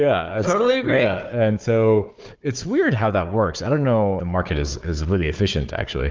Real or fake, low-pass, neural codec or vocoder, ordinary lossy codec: fake; 7.2 kHz; codec, 16 kHz, 4 kbps, FunCodec, trained on Chinese and English, 50 frames a second; Opus, 32 kbps